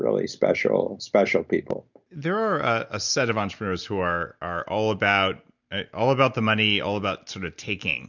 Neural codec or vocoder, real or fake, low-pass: none; real; 7.2 kHz